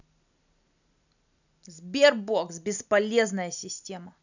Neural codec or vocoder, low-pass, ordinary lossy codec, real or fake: none; 7.2 kHz; none; real